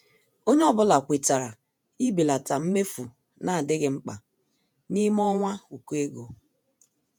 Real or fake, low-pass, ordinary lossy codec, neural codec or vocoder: fake; none; none; vocoder, 48 kHz, 128 mel bands, Vocos